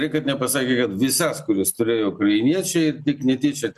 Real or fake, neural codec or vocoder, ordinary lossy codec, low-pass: real; none; MP3, 96 kbps; 14.4 kHz